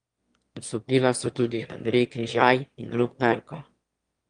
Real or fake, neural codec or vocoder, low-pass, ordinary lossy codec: fake; autoencoder, 22.05 kHz, a latent of 192 numbers a frame, VITS, trained on one speaker; 9.9 kHz; Opus, 32 kbps